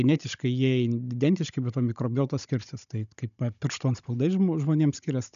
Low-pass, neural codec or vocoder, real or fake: 7.2 kHz; codec, 16 kHz, 16 kbps, FunCodec, trained on Chinese and English, 50 frames a second; fake